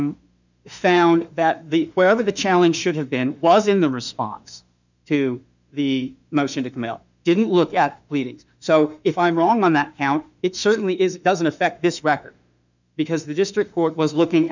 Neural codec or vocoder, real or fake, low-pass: autoencoder, 48 kHz, 32 numbers a frame, DAC-VAE, trained on Japanese speech; fake; 7.2 kHz